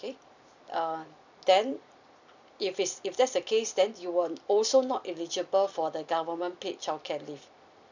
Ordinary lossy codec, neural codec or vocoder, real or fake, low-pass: none; vocoder, 44.1 kHz, 128 mel bands every 256 samples, BigVGAN v2; fake; 7.2 kHz